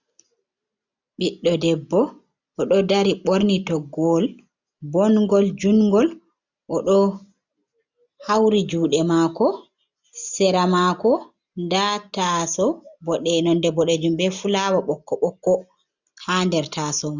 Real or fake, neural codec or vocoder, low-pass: real; none; 7.2 kHz